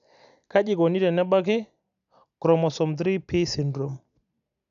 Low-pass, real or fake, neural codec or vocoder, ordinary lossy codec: 7.2 kHz; real; none; none